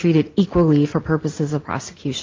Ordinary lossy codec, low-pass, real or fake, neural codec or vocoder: Opus, 32 kbps; 7.2 kHz; real; none